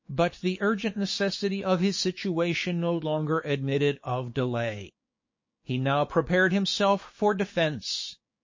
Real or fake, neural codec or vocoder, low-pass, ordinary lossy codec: fake; codec, 16 kHz, 6 kbps, DAC; 7.2 kHz; MP3, 32 kbps